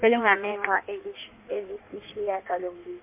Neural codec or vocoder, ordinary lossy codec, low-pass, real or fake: codec, 16 kHz in and 24 kHz out, 1.1 kbps, FireRedTTS-2 codec; MP3, 32 kbps; 3.6 kHz; fake